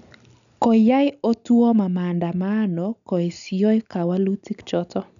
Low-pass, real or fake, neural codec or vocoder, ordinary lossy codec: 7.2 kHz; real; none; none